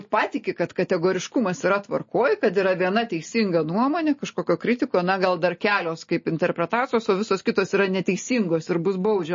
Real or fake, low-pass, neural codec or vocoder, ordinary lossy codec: real; 7.2 kHz; none; MP3, 32 kbps